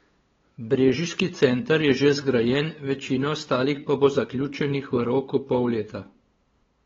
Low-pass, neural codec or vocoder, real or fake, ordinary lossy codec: 7.2 kHz; codec, 16 kHz, 8 kbps, FunCodec, trained on LibriTTS, 25 frames a second; fake; AAC, 24 kbps